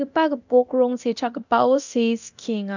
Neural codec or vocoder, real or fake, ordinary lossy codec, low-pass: codec, 24 kHz, 0.9 kbps, DualCodec; fake; none; 7.2 kHz